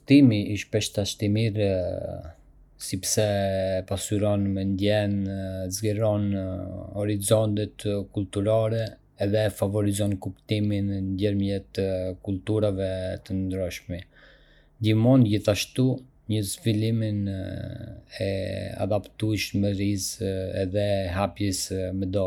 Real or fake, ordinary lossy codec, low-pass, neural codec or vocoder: real; none; 19.8 kHz; none